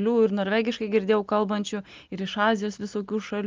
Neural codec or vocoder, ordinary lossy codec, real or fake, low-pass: none; Opus, 32 kbps; real; 7.2 kHz